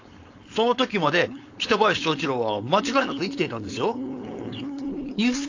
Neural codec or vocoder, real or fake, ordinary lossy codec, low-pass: codec, 16 kHz, 4.8 kbps, FACodec; fake; AAC, 48 kbps; 7.2 kHz